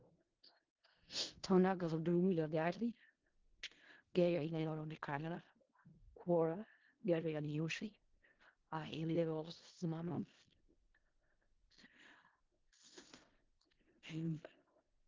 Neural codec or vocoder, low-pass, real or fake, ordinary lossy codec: codec, 16 kHz in and 24 kHz out, 0.4 kbps, LongCat-Audio-Codec, four codebook decoder; 7.2 kHz; fake; Opus, 16 kbps